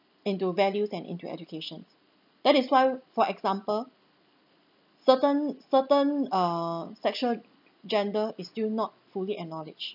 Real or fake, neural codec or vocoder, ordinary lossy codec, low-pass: real; none; none; 5.4 kHz